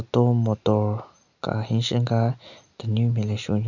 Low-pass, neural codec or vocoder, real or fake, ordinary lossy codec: 7.2 kHz; none; real; none